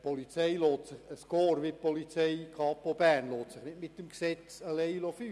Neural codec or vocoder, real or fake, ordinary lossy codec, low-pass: none; real; none; none